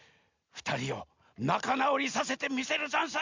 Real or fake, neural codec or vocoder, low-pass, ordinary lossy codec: real; none; 7.2 kHz; MP3, 64 kbps